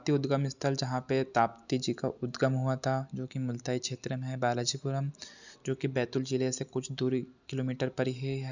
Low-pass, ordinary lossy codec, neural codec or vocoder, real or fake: 7.2 kHz; none; none; real